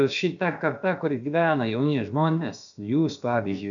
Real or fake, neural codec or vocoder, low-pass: fake; codec, 16 kHz, about 1 kbps, DyCAST, with the encoder's durations; 7.2 kHz